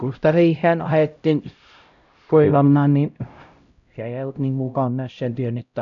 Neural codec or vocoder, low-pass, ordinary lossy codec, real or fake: codec, 16 kHz, 0.5 kbps, X-Codec, HuBERT features, trained on LibriSpeech; 7.2 kHz; none; fake